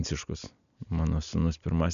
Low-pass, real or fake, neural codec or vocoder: 7.2 kHz; real; none